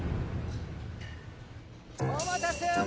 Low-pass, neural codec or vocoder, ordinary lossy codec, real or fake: none; none; none; real